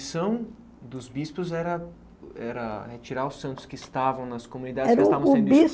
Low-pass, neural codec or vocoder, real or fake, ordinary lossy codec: none; none; real; none